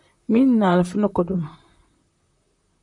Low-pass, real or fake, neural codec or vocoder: 10.8 kHz; fake; vocoder, 44.1 kHz, 128 mel bands, Pupu-Vocoder